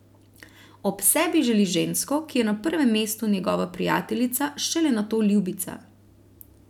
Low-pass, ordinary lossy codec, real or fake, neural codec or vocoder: 19.8 kHz; none; real; none